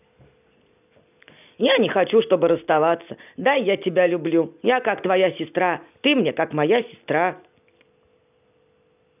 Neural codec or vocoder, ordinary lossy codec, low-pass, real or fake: none; none; 3.6 kHz; real